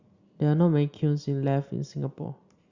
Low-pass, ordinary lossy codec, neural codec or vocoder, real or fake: 7.2 kHz; Opus, 64 kbps; none; real